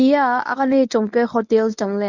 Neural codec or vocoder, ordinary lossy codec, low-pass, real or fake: codec, 24 kHz, 0.9 kbps, WavTokenizer, medium speech release version 1; none; 7.2 kHz; fake